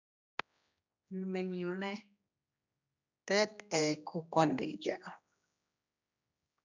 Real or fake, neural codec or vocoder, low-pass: fake; codec, 16 kHz, 1 kbps, X-Codec, HuBERT features, trained on general audio; 7.2 kHz